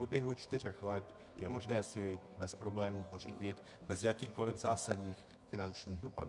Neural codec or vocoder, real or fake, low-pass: codec, 24 kHz, 0.9 kbps, WavTokenizer, medium music audio release; fake; 10.8 kHz